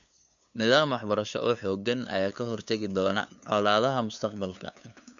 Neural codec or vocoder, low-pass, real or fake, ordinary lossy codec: codec, 16 kHz, 2 kbps, FunCodec, trained on LibriTTS, 25 frames a second; 7.2 kHz; fake; none